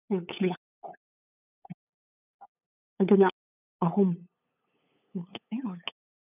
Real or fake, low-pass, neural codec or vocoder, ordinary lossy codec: fake; 3.6 kHz; codec, 16 kHz, 8 kbps, FunCodec, trained on LibriTTS, 25 frames a second; none